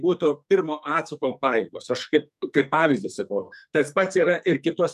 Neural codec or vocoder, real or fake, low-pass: codec, 44.1 kHz, 2.6 kbps, SNAC; fake; 14.4 kHz